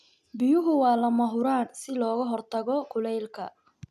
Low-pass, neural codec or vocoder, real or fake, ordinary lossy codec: 14.4 kHz; none; real; none